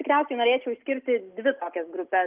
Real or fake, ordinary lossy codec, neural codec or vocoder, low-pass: real; Opus, 32 kbps; none; 3.6 kHz